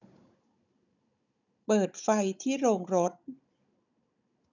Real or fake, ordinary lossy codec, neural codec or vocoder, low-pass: fake; none; codec, 16 kHz, 16 kbps, FunCodec, trained on Chinese and English, 50 frames a second; 7.2 kHz